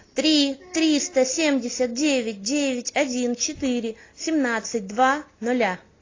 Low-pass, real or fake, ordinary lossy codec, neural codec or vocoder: 7.2 kHz; real; AAC, 32 kbps; none